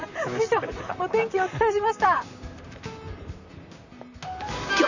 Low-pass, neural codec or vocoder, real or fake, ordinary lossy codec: 7.2 kHz; vocoder, 44.1 kHz, 128 mel bands, Pupu-Vocoder; fake; none